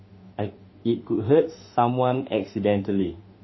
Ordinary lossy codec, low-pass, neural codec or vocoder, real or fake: MP3, 24 kbps; 7.2 kHz; autoencoder, 48 kHz, 32 numbers a frame, DAC-VAE, trained on Japanese speech; fake